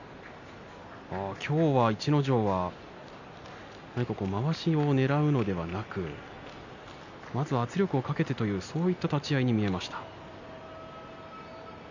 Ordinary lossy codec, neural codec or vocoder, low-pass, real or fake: none; none; 7.2 kHz; real